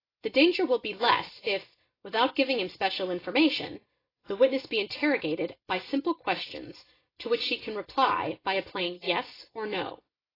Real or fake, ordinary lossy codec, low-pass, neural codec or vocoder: real; AAC, 24 kbps; 5.4 kHz; none